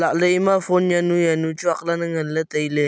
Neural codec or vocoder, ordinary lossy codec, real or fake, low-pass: none; none; real; none